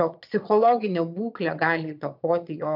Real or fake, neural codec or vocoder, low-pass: fake; vocoder, 22.05 kHz, 80 mel bands, Vocos; 5.4 kHz